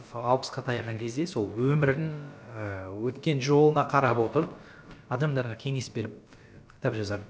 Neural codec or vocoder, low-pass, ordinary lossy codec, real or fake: codec, 16 kHz, about 1 kbps, DyCAST, with the encoder's durations; none; none; fake